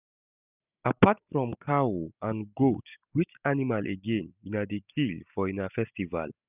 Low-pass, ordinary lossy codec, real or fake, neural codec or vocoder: 3.6 kHz; none; real; none